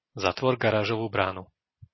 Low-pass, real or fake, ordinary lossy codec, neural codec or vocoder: 7.2 kHz; real; MP3, 24 kbps; none